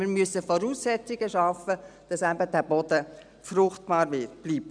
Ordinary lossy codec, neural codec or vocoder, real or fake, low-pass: none; none; real; 9.9 kHz